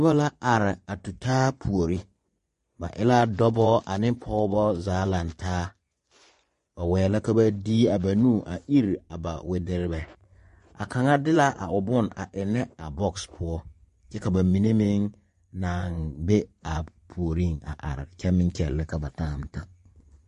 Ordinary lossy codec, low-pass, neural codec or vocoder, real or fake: MP3, 48 kbps; 10.8 kHz; vocoder, 24 kHz, 100 mel bands, Vocos; fake